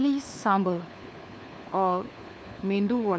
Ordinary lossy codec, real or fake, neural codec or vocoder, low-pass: none; fake; codec, 16 kHz, 4 kbps, FunCodec, trained on LibriTTS, 50 frames a second; none